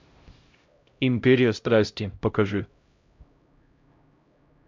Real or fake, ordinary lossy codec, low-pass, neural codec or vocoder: fake; MP3, 64 kbps; 7.2 kHz; codec, 16 kHz, 0.5 kbps, X-Codec, HuBERT features, trained on LibriSpeech